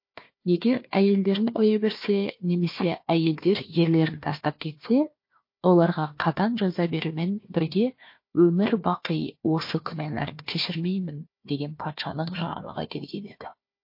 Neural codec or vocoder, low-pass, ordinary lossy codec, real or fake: codec, 16 kHz, 1 kbps, FunCodec, trained on Chinese and English, 50 frames a second; 5.4 kHz; MP3, 32 kbps; fake